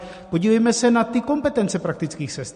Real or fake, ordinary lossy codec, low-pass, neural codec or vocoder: real; MP3, 48 kbps; 14.4 kHz; none